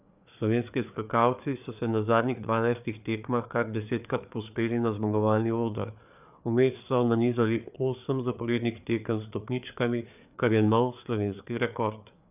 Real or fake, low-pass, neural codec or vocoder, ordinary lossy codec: fake; 3.6 kHz; codec, 16 kHz, 4 kbps, FreqCodec, larger model; none